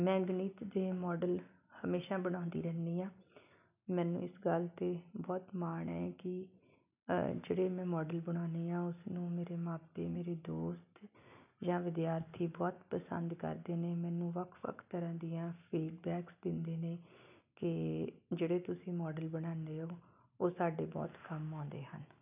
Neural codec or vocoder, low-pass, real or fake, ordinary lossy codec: none; 3.6 kHz; real; none